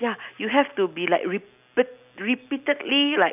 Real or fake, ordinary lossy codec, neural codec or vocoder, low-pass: real; none; none; 3.6 kHz